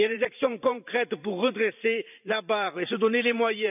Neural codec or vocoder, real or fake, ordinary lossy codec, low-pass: vocoder, 44.1 kHz, 128 mel bands every 512 samples, BigVGAN v2; fake; none; 3.6 kHz